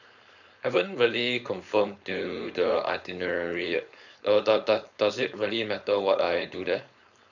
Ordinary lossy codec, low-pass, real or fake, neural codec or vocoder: none; 7.2 kHz; fake; codec, 16 kHz, 4.8 kbps, FACodec